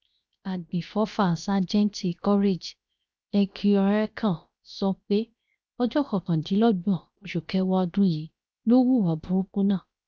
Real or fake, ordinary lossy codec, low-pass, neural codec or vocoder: fake; none; none; codec, 16 kHz, 0.7 kbps, FocalCodec